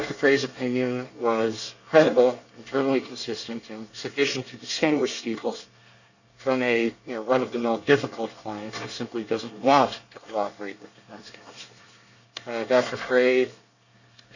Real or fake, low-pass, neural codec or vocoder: fake; 7.2 kHz; codec, 24 kHz, 1 kbps, SNAC